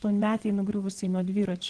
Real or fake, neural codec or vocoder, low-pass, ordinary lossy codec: fake; vocoder, 22.05 kHz, 80 mel bands, WaveNeXt; 9.9 kHz; Opus, 16 kbps